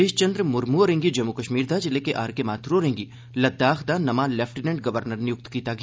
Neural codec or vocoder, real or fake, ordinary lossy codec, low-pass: none; real; none; none